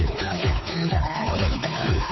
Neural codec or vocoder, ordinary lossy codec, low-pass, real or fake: codec, 16 kHz, 4 kbps, X-Codec, WavLM features, trained on Multilingual LibriSpeech; MP3, 24 kbps; 7.2 kHz; fake